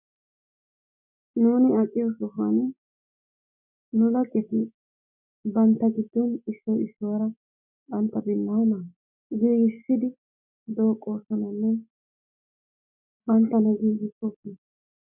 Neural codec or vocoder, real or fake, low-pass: none; real; 3.6 kHz